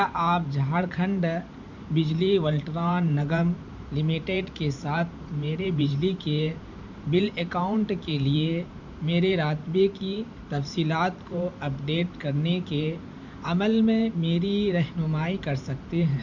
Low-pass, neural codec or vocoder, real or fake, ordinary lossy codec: 7.2 kHz; vocoder, 44.1 kHz, 128 mel bands every 512 samples, BigVGAN v2; fake; none